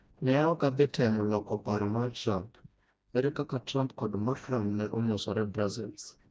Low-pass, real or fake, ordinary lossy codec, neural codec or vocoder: none; fake; none; codec, 16 kHz, 1 kbps, FreqCodec, smaller model